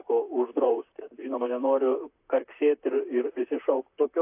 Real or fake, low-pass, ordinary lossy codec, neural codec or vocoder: fake; 3.6 kHz; AAC, 32 kbps; vocoder, 44.1 kHz, 128 mel bands, Pupu-Vocoder